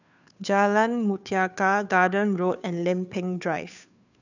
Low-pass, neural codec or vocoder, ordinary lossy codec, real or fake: 7.2 kHz; codec, 16 kHz, 2 kbps, FunCodec, trained on Chinese and English, 25 frames a second; none; fake